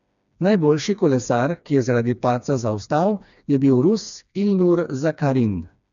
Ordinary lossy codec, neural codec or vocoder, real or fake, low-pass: none; codec, 16 kHz, 2 kbps, FreqCodec, smaller model; fake; 7.2 kHz